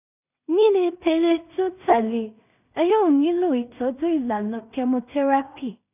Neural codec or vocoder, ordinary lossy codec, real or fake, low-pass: codec, 16 kHz in and 24 kHz out, 0.4 kbps, LongCat-Audio-Codec, two codebook decoder; none; fake; 3.6 kHz